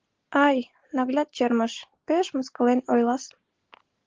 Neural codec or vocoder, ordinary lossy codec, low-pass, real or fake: none; Opus, 16 kbps; 7.2 kHz; real